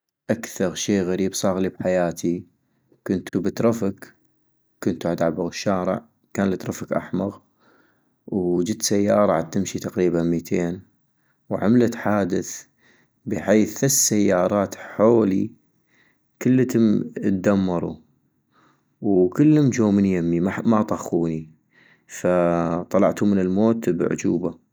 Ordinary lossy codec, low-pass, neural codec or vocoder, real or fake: none; none; none; real